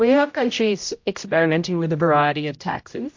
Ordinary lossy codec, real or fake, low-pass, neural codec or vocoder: MP3, 48 kbps; fake; 7.2 kHz; codec, 16 kHz, 0.5 kbps, X-Codec, HuBERT features, trained on general audio